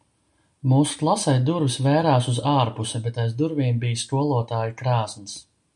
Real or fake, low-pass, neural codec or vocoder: real; 10.8 kHz; none